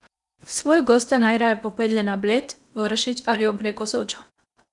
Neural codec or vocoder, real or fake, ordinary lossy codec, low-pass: codec, 16 kHz in and 24 kHz out, 0.8 kbps, FocalCodec, streaming, 65536 codes; fake; none; 10.8 kHz